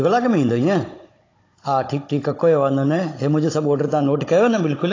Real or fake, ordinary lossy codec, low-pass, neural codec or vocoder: fake; AAC, 32 kbps; 7.2 kHz; codec, 16 kHz, 16 kbps, FunCodec, trained on Chinese and English, 50 frames a second